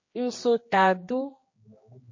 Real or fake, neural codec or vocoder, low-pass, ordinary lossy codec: fake; codec, 16 kHz, 2 kbps, X-Codec, HuBERT features, trained on general audio; 7.2 kHz; MP3, 32 kbps